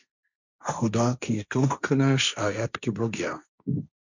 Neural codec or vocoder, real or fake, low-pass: codec, 16 kHz, 1.1 kbps, Voila-Tokenizer; fake; 7.2 kHz